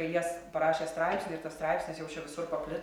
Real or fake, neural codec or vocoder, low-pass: real; none; 19.8 kHz